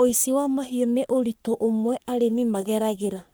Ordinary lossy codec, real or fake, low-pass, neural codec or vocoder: none; fake; none; codec, 44.1 kHz, 3.4 kbps, Pupu-Codec